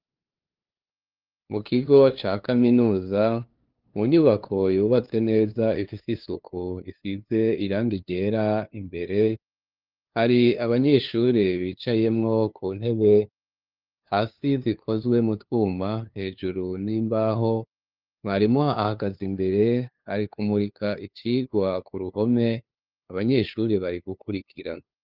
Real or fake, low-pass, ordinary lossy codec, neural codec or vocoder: fake; 5.4 kHz; Opus, 24 kbps; codec, 16 kHz, 2 kbps, FunCodec, trained on LibriTTS, 25 frames a second